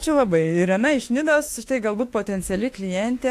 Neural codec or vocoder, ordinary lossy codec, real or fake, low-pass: autoencoder, 48 kHz, 32 numbers a frame, DAC-VAE, trained on Japanese speech; AAC, 64 kbps; fake; 14.4 kHz